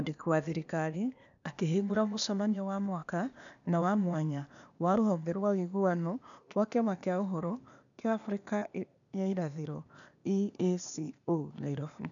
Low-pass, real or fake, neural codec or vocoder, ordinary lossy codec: 7.2 kHz; fake; codec, 16 kHz, 0.8 kbps, ZipCodec; none